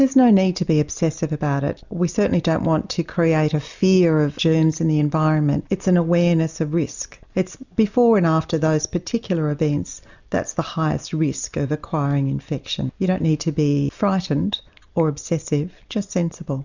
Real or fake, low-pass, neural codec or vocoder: real; 7.2 kHz; none